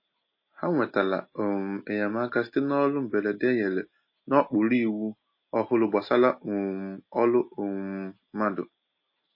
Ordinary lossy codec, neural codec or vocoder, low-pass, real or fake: MP3, 24 kbps; none; 5.4 kHz; real